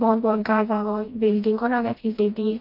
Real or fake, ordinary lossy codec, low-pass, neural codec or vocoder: fake; MP3, 32 kbps; 5.4 kHz; codec, 16 kHz, 1 kbps, FreqCodec, smaller model